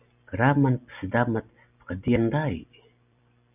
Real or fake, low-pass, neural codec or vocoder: real; 3.6 kHz; none